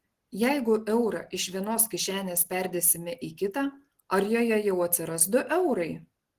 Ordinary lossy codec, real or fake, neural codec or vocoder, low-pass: Opus, 16 kbps; real; none; 14.4 kHz